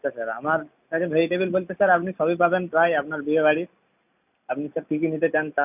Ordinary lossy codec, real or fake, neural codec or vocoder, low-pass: none; real; none; 3.6 kHz